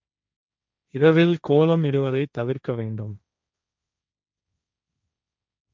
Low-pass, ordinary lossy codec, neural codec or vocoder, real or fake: none; none; codec, 16 kHz, 1.1 kbps, Voila-Tokenizer; fake